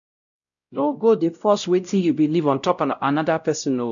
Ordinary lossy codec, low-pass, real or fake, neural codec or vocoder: none; 7.2 kHz; fake; codec, 16 kHz, 0.5 kbps, X-Codec, WavLM features, trained on Multilingual LibriSpeech